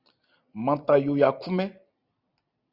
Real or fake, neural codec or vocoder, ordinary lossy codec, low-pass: fake; vocoder, 44.1 kHz, 128 mel bands every 256 samples, BigVGAN v2; Opus, 64 kbps; 5.4 kHz